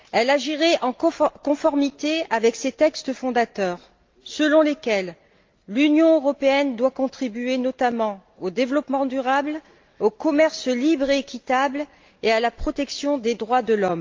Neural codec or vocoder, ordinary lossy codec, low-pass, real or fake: none; Opus, 24 kbps; 7.2 kHz; real